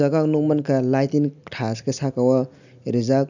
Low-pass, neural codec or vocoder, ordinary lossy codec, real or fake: 7.2 kHz; none; none; real